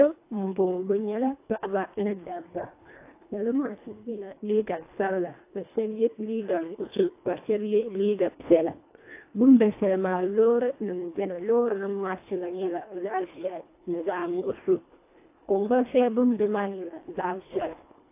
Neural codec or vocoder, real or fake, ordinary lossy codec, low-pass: codec, 24 kHz, 1.5 kbps, HILCodec; fake; AAC, 24 kbps; 3.6 kHz